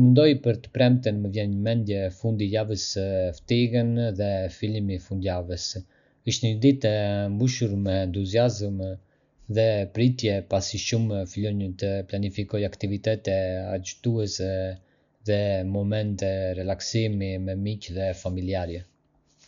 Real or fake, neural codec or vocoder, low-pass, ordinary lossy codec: real; none; 7.2 kHz; none